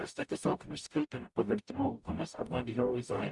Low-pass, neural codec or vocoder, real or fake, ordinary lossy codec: 10.8 kHz; codec, 44.1 kHz, 0.9 kbps, DAC; fake; Opus, 64 kbps